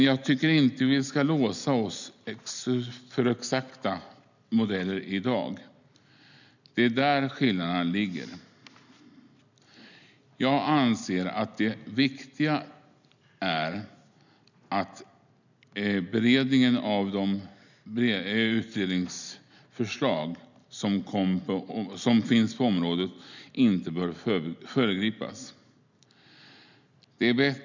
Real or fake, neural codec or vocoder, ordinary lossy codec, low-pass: real; none; none; 7.2 kHz